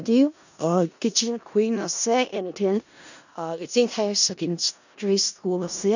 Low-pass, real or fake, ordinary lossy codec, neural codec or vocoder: 7.2 kHz; fake; none; codec, 16 kHz in and 24 kHz out, 0.4 kbps, LongCat-Audio-Codec, four codebook decoder